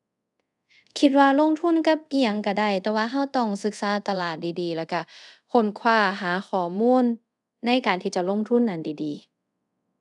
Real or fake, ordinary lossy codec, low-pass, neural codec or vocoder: fake; none; 10.8 kHz; codec, 24 kHz, 0.5 kbps, DualCodec